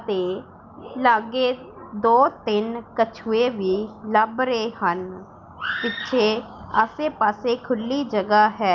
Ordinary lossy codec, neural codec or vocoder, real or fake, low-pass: Opus, 24 kbps; none; real; 7.2 kHz